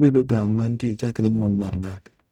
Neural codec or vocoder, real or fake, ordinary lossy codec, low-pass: codec, 44.1 kHz, 0.9 kbps, DAC; fake; none; 19.8 kHz